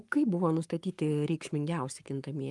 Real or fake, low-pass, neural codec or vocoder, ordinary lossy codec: fake; 10.8 kHz; codec, 44.1 kHz, 7.8 kbps, Pupu-Codec; Opus, 32 kbps